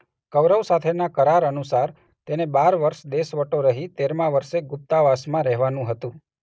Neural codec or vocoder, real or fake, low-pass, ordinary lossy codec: none; real; none; none